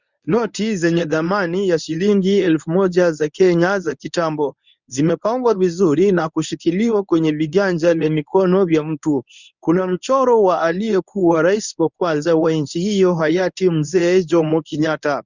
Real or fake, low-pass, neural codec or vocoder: fake; 7.2 kHz; codec, 24 kHz, 0.9 kbps, WavTokenizer, medium speech release version 1